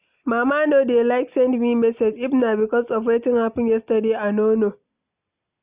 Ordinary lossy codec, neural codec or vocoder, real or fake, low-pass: Opus, 64 kbps; none; real; 3.6 kHz